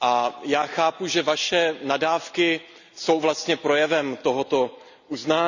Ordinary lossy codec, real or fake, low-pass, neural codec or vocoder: none; real; 7.2 kHz; none